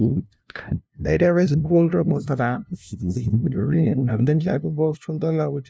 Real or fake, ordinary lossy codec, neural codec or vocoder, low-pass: fake; none; codec, 16 kHz, 0.5 kbps, FunCodec, trained on LibriTTS, 25 frames a second; none